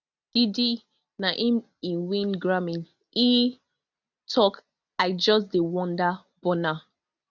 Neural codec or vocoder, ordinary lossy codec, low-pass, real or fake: none; none; 7.2 kHz; real